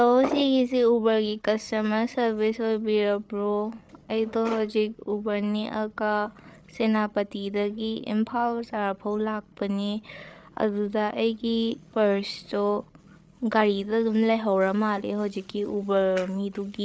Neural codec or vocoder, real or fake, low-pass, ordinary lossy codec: codec, 16 kHz, 8 kbps, FreqCodec, larger model; fake; none; none